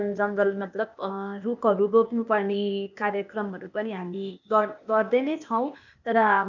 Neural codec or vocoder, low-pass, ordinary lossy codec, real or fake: codec, 16 kHz, 0.8 kbps, ZipCodec; 7.2 kHz; none; fake